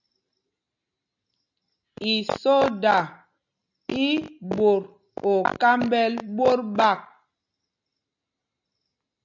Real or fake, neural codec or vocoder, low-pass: real; none; 7.2 kHz